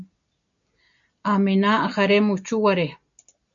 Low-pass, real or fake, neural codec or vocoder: 7.2 kHz; real; none